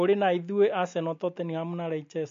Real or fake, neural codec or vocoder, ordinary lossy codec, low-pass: real; none; MP3, 48 kbps; 7.2 kHz